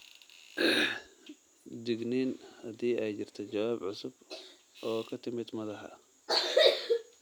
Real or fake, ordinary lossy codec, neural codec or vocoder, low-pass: real; none; none; none